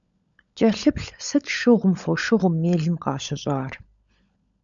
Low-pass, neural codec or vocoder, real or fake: 7.2 kHz; codec, 16 kHz, 16 kbps, FunCodec, trained on LibriTTS, 50 frames a second; fake